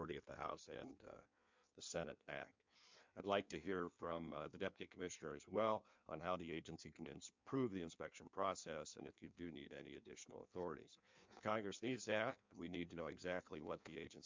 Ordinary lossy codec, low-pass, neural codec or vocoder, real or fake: MP3, 64 kbps; 7.2 kHz; codec, 16 kHz in and 24 kHz out, 1.1 kbps, FireRedTTS-2 codec; fake